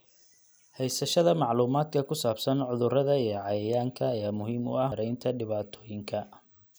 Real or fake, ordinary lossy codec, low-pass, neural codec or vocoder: real; none; none; none